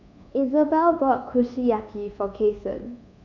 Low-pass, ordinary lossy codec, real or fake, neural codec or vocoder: 7.2 kHz; none; fake; codec, 24 kHz, 1.2 kbps, DualCodec